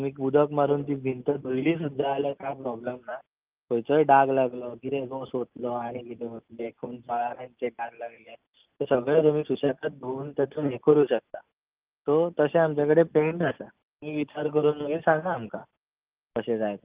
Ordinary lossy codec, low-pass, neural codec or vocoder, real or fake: Opus, 32 kbps; 3.6 kHz; none; real